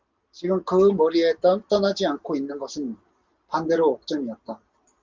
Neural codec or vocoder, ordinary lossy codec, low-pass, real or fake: none; Opus, 32 kbps; 7.2 kHz; real